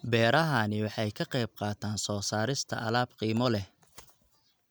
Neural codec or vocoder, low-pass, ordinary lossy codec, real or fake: none; none; none; real